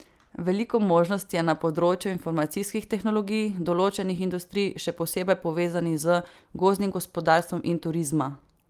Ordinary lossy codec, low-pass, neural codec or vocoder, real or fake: Opus, 32 kbps; 14.4 kHz; none; real